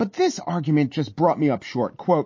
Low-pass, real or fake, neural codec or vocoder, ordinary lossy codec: 7.2 kHz; real; none; MP3, 32 kbps